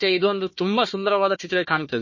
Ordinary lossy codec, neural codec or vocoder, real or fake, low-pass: MP3, 32 kbps; codec, 16 kHz, 1 kbps, FunCodec, trained on Chinese and English, 50 frames a second; fake; 7.2 kHz